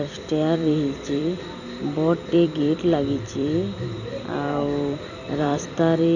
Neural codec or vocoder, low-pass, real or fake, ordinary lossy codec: vocoder, 44.1 kHz, 128 mel bands every 256 samples, BigVGAN v2; 7.2 kHz; fake; none